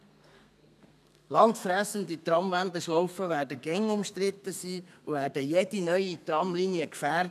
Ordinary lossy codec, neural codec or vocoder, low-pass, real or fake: none; codec, 32 kHz, 1.9 kbps, SNAC; 14.4 kHz; fake